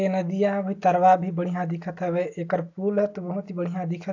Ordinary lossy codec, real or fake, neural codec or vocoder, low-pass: AAC, 48 kbps; real; none; 7.2 kHz